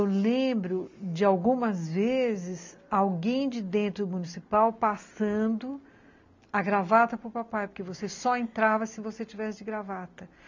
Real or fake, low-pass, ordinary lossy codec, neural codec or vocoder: real; 7.2 kHz; MP3, 64 kbps; none